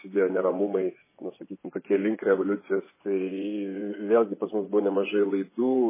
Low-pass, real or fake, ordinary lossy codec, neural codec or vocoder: 3.6 kHz; fake; MP3, 16 kbps; vocoder, 24 kHz, 100 mel bands, Vocos